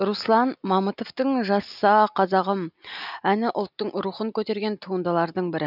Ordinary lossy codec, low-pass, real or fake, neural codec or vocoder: none; 5.4 kHz; real; none